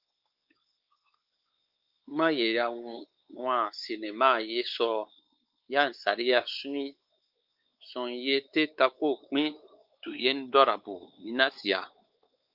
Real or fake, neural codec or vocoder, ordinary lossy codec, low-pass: fake; codec, 16 kHz, 4 kbps, X-Codec, WavLM features, trained on Multilingual LibriSpeech; Opus, 32 kbps; 5.4 kHz